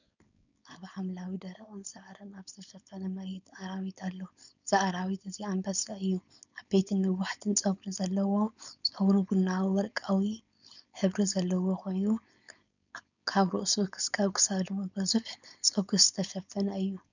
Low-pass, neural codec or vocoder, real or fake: 7.2 kHz; codec, 16 kHz, 4.8 kbps, FACodec; fake